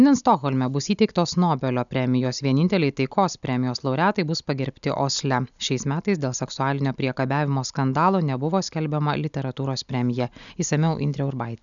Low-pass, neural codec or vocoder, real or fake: 7.2 kHz; none; real